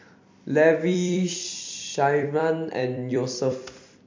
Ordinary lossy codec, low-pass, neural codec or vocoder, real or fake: MP3, 48 kbps; 7.2 kHz; vocoder, 44.1 kHz, 128 mel bands every 256 samples, BigVGAN v2; fake